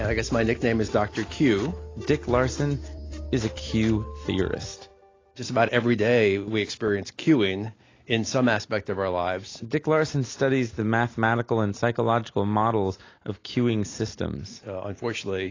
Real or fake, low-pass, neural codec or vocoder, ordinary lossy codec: real; 7.2 kHz; none; AAC, 32 kbps